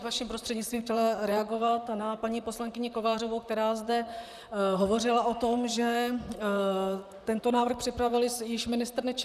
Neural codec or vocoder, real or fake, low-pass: vocoder, 44.1 kHz, 128 mel bands, Pupu-Vocoder; fake; 14.4 kHz